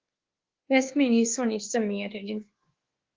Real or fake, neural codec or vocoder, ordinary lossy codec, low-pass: fake; codec, 24 kHz, 1.2 kbps, DualCodec; Opus, 16 kbps; 7.2 kHz